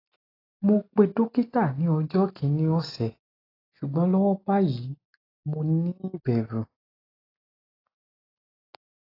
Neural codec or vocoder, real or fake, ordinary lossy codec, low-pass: none; real; AAC, 24 kbps; 5.4 kHz